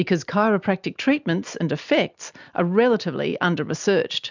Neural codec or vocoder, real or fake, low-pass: none; real; 7.2 kHz